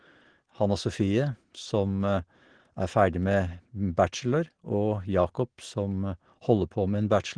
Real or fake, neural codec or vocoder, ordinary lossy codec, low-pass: real; none; Opus, 16 kbps; 9.9 kHz